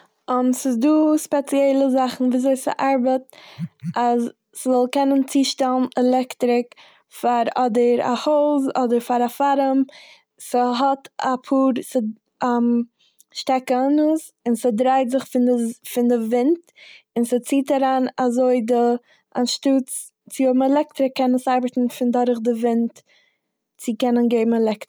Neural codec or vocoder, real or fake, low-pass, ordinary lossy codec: none; real; none; none